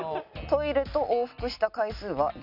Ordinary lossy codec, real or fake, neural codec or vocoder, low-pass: none; real; none; 5.4 kHz